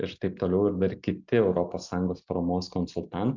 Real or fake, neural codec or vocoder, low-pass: real; none; 7.2 kHz